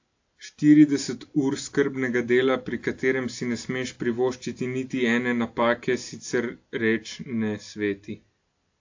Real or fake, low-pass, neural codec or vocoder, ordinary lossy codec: real; 7.2 kHz; none; AAC, 48 kbps